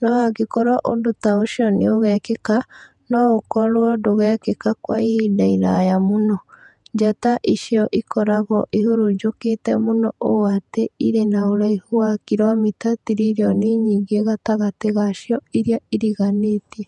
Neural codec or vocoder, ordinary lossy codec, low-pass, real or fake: vocoder, 48 kHz, 128 mel bands, Vocos; MP3, 96 kbps; 10.8 kHz; fake